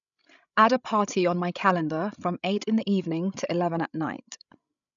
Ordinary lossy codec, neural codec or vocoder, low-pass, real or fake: none; codec, 16 kHz, 16 kbps, FreqCodec, larger model; 7.2 kHz; fake